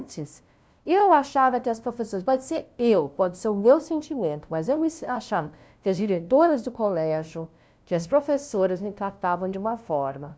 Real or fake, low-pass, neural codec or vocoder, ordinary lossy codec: fake; none; codec, 16 kHz, 0.5 kbps, FunCodec, trained on LibriTTS, 25 frames a second; none